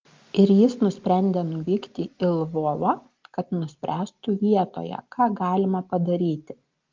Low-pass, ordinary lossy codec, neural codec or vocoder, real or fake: 7.2 kHz; Opus, 32 kbps; none; real